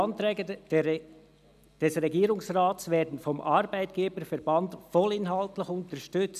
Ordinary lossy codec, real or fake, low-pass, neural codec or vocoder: none; real; 14.4 kHz; none